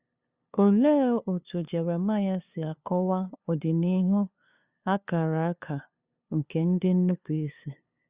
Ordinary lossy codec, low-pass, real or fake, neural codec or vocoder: Opus, 64 kbps; 3.6 kHz; fake; codec, 16 kHz, 2 kbps, FunCodec, trained on LibriTTS, 25 frames a second